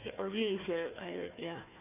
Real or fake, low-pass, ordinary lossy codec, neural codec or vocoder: fake; 3.6 kHz; none; codec, 16 kHz, 1 kbps, FunCodec, trained on Chinese and English, 50 frames a second